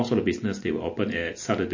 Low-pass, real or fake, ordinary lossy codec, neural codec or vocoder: 7.2 kHz; real; MP3, 32 kbps; none